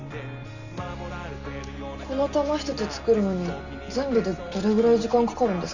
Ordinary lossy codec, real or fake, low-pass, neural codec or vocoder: none; real; 7.2 kHz; none